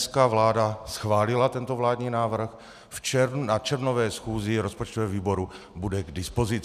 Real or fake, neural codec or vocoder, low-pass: real; none; 14.4 kHz